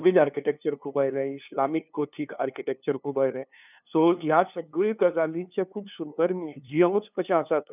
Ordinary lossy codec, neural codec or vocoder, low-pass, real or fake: none; codec, 16 kHz, 2 kbps, FunCodec, trained on LibriTTS, 25 frames a second; 3.6 kHz; fake